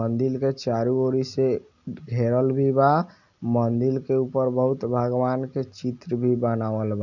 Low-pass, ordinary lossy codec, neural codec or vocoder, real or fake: 7.2 kHz; none; none; real